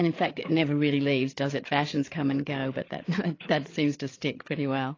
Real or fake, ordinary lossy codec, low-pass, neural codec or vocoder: fake; AAC, 32 kbps; 7.2 kHz; codec, 16 kHz, 8 kbps, FreqCodec, larger model